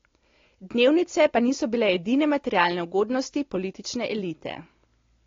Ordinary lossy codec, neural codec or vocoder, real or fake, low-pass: AAC, 32 kbps; none; real; 7.2 kHz